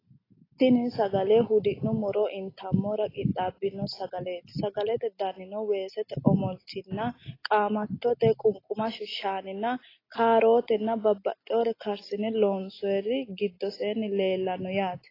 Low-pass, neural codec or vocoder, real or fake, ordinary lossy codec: 5.4 kHz; none; real; AAC, 24 kbps